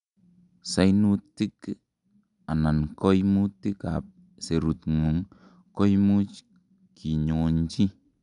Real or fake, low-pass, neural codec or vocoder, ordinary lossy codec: real; 10.8 kHz; none; none